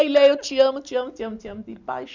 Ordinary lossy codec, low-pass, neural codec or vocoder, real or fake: none; 7.2 kHz; none; real